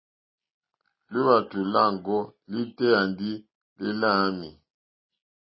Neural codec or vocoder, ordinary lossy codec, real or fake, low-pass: none; MP3, 24 kbps; real; 7.2 kHz